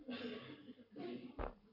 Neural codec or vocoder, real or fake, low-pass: codec, 44.1 kHz, 7.8 kbps, Pupu-Codec; fake; 5.4 kHz